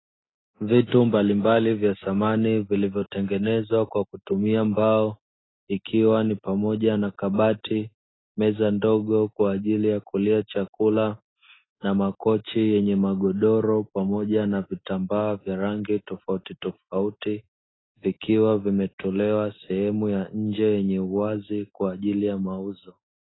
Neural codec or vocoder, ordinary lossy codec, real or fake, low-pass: none; AAC, 16 kbps; real; 7.2 kHz